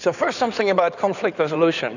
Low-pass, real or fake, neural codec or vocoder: 7.2 kHz; fake; codec, 16 kHz in and 24 kHz out, 2.2 kbps, FireRedTTS-2 codec